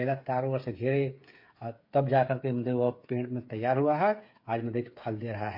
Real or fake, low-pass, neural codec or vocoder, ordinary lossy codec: fake; 5.4 kHz; codec, 16 kHz, 8 kbps, FreqCodec, smaller model; MP3, 32 kbps